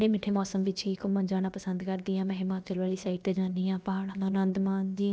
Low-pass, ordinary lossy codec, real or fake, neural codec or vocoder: none; none; fake; codec, 16 kHz, about 1 kbps, DyCAST, with the encoder's durations